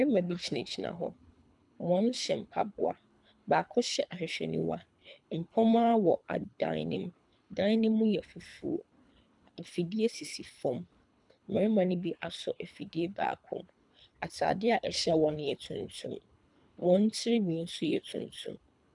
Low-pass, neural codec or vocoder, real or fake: 10.8 kHz; codec, 24 kHz, 3 kbps, HILCodec; fake